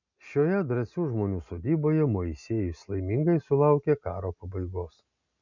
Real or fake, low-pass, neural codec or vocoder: real; 7.2 kHz; none